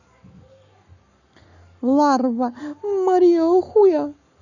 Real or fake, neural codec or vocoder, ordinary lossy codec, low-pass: fake; codec, 44.1 kHz, 7.8 kbps, Pupu-Codec; none; 7.2 kHz